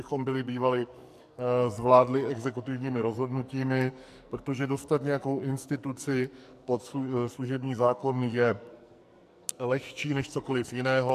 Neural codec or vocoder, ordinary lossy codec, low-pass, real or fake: codec, 44.1 kHz, 2.6 kbps, SNAC; MP3, 96 kbps; 14.4 kHz; fake